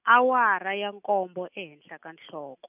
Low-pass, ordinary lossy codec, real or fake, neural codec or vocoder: 3.6 kHz; none; real; none